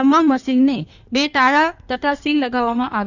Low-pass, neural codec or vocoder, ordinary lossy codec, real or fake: 7.2 kHz; codec, 16 kHz in and 24 kHz out, 1.1 kbps, FireRedTTS-2 codec; none; fake